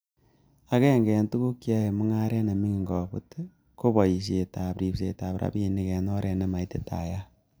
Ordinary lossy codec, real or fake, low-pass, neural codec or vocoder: none; real; none; none